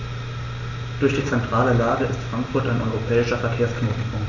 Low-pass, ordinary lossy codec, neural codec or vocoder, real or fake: 7.2 kHz; none; none; real